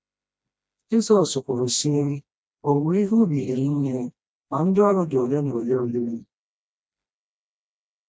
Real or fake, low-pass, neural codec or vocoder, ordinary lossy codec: fake; none; codec, 16 kHz, 1 kbps, FreqCodec, smaller model; none